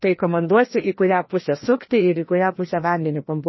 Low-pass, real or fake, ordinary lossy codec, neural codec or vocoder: 7.2 kHz; fake; MP3, 24 kbps; codec, 16 kHz, 1 kbps, FreqCodec, larger model